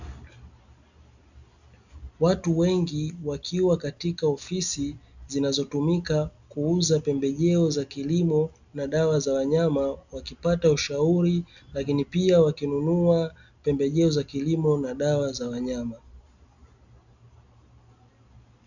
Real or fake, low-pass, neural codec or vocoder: real; 7.2 kHz; none